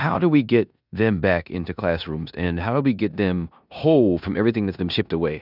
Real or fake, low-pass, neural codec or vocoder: fake; 5.4 kHz; codec, 16 kHz in and 24 kHz out, 0.9 kbps, LongCat-Audio-Codec, four codebook decoder